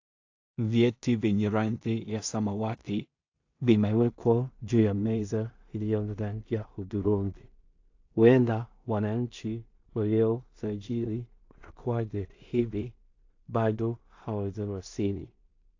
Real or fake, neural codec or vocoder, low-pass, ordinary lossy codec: fake; codec, 16 kHz in and 24 kHz out, 0.4 kbps, LongCat-Audio-Codec, two codebook decoder; 7.2 kHz; AAC, 48 kbps